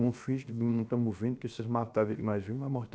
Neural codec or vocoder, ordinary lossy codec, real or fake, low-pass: codec, 16 kHz, 0.7 kbps, FocalCodec; none; fake; none